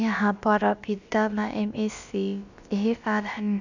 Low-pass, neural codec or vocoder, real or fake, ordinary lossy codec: 7.2 kHz; codec, 16 kHz, about 1 kbps, DyCAST, with the encoder's durations; fake; Opus, 64 kbps